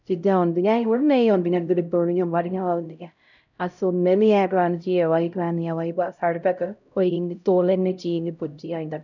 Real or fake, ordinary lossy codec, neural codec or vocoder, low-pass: fake; none; codec, 16 kHz, 0.5 kbps, X-Codec, HuBERT features, trained on LibriSpeech; 7.2 kHz